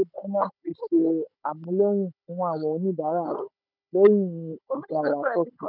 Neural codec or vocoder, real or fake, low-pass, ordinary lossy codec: codec, 16 kHz, 16 kbps, FunCodec, trained on Chinese and English, 50 frames a second; fake; 5.4 kHz; none